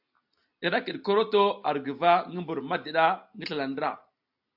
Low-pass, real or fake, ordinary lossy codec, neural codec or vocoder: 5.4 kHz; real; AAC, 48 kbps; none